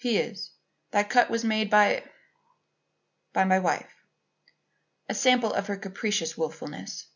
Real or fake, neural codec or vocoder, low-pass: real; none; 7.2 kHz